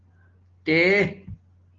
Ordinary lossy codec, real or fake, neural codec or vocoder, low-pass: Opus, 16 kbps; real; none; 7.2 kHz